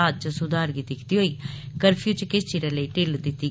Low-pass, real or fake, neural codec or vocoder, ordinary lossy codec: none; real; none; none